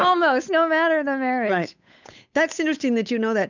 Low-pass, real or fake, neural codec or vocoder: 7.2 kHz; fake; codec, 16 kHz, 8 kbps, FunCodec, trained on Chinese and English, 25 frames a second